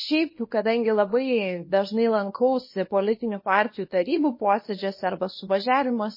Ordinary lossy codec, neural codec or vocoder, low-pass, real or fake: MP3, 24 kbps; codec, 24 kHz, 0.9 kbps, WavTokenizer, small release; 5.4 kHz; fake